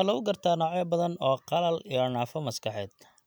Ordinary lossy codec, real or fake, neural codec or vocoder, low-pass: none; real; none; none